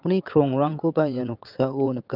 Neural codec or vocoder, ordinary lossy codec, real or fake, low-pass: vocoder, 22.05 kHz, 80 mel bands, WaveNeXt; Opus, 24 kbps; fake; 5.4 kHz